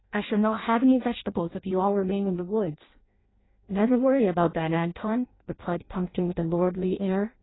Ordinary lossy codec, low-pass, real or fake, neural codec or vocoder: AAC, 16 kbps; 7.2 kHz; fake; codec, 16 kHz in and 24 kHz out, 0.6 kbps, FireRedTTS-2 codec